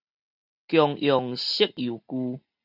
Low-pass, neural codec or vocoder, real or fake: 5.4 kHz; none; real